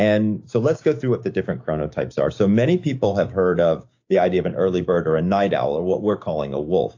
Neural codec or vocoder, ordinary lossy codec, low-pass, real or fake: codec, 16 kHz, 6 kbps, DAC; AAC, 48 kbps; 7.2 kHz; fake